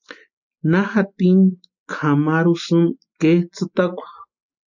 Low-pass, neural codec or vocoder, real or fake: 7.2 kHz; none; real